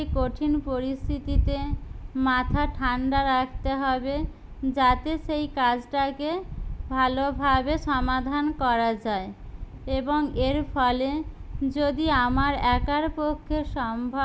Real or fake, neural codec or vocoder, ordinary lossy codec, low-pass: real; none; none; none